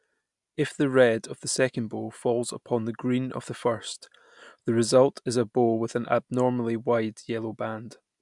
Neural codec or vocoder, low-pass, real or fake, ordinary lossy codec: none; 10.8 kHz; real; MP3, 96 kbps